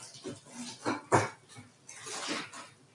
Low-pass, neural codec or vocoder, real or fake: 10.8 kHz; none; real